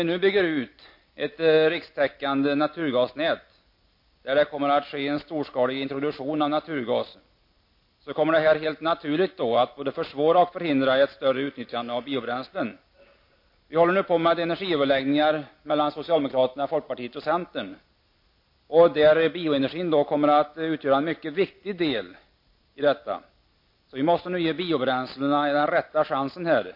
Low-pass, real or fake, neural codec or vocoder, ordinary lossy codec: 5.4 kHz; real; none; MP3, 32 kbps